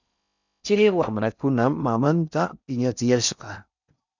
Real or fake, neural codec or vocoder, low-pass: fake; codec, 16 kHz in and 24 kHz out, 0.6 kbps, FocalCodec, streaming, 4096 codes; 7.2 kHz